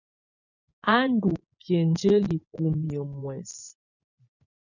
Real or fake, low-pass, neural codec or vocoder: fake; 7.2 kHz; vocoder, 24 kHz, 100 mel bands, Vocos